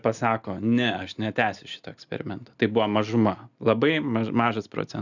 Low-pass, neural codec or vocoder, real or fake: 7.2 kHz; none; real